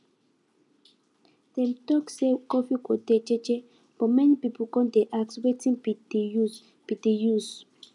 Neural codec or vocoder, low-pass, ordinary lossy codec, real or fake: none; 10.8 kHz; none; real